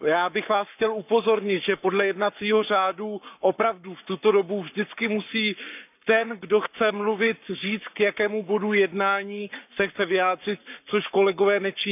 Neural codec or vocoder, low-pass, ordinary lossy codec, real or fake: codec, 44.1 kHz, 7.8 kbps, Pupu-Codec; 3.6 kHz; none; fake